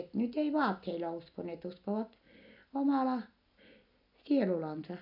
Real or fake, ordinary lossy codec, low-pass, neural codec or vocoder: real; none; 5.4 kHz; none